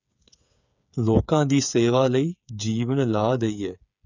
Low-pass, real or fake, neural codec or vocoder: 7.2 kHz; fake; codec, 16 kHz, 8 kbps, FreqCodec, smaller model